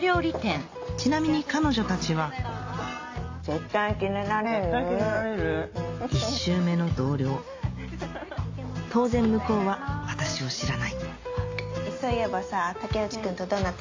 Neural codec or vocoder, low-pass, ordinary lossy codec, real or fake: none; 7.2 kHz; AAC, 48 kbps; real